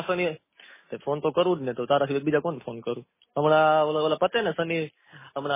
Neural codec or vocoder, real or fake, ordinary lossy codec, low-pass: none; real; MP3, 16 kbps; 3.6 kHz